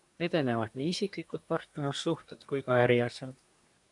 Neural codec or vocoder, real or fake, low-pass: codec, 24 kHz, 1 kbps, SNAC; fake; 10.8 kHz